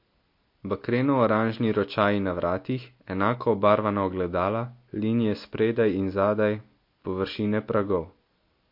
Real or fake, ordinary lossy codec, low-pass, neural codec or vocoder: real; MP3, 32 kbps; 5.4 kHz; none